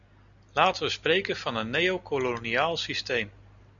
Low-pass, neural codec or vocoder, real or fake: 7.2 kHz; none; real